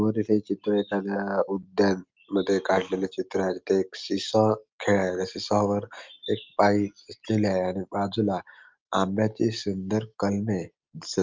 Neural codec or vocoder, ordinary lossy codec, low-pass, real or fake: none; Opus, 32 kbps; 7.2 kHz; real